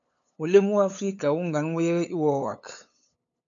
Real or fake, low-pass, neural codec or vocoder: fake; 7.2 kHz; codec, 16 kHz, 8 kbps, FunCodec, trained on LibriTTS, 25 frames a second